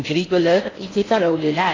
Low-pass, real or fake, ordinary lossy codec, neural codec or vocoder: 7.2 kHz; fake; AAC, 32 kbps; codec, 16 kHz in and 24 kHz out, 0.6 kbps, FocalCodec, streaming, 4096 codes